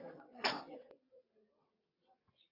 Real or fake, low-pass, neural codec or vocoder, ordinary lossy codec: fake; 5.4 kHz; vocoder, 22.05 kHz, 80 mel bands, WaveNeXt; AAC, 24 kbps